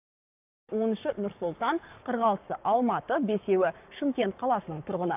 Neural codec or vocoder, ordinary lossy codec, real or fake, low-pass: codec, 44.1 kHz, 7.8 kbps, Pupu-Codec; AAC, 32 kbps; fake; 3.6 kHz